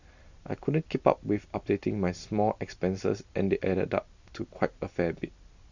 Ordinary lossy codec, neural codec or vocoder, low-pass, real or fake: Opus, 64 kbps; none; 7.2 kHz; real